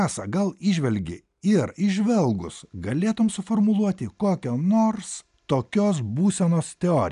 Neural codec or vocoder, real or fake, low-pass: none; real; 10.8 kHz